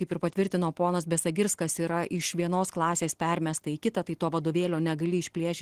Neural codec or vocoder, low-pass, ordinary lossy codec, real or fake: none; 14.4 kHz; Opus, 16 kbps; real